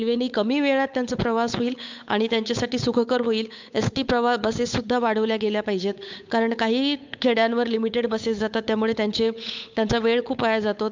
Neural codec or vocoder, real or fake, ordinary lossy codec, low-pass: codec, 16 kHz, 8 kbps, FunCodec, trained on Chinese and English, 25 frames a second; fake; MP3, 64 kbps; 7.2 kHz